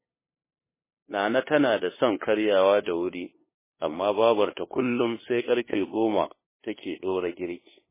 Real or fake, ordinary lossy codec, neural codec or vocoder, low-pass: fake; MP3, 16 kbps; codec, 16 kHz, 2 kbps, FunCodec, trained on LibriTTS, 25 frames a second; 3.6 kHz